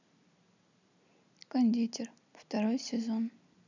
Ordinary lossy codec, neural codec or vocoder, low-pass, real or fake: none; none; 7.2 kHz; real